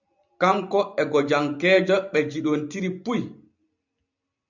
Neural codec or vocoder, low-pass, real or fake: vocoder, 44.1 kHz, 128 mel bands every 256 samples, BigVGAN v2; 7.2 kHz; fake